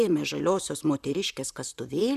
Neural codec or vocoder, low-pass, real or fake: vocoder, 44.1 kHz, 128 mel bands, Pupu-Vocoder; 14.4 kHz; fake